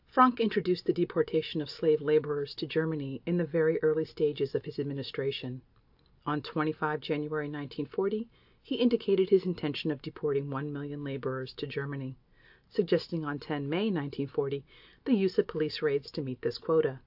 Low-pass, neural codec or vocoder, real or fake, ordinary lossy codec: 5.4 kHz; none; real; AAC, 48 kbps